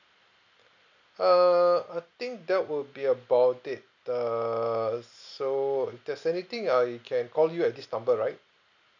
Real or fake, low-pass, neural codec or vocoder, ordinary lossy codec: real; 7.2 kHz; none; none